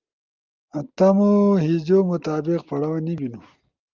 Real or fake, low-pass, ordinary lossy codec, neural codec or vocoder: real; 7.2 kHz; Opus, 24 kbps; none